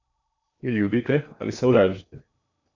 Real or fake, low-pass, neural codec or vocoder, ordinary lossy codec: fake; 7.2 kHz; codec, 16 kHz in and 24 kHz out, 0.8 kbps, FocalCodec, streaming, 65536 codes; none